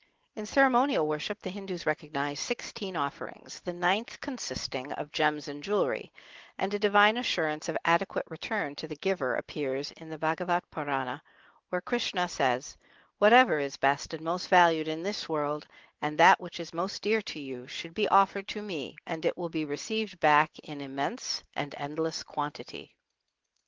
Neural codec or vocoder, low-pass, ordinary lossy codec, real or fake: none; 7.2 kHz; Opus, 16 kbps; real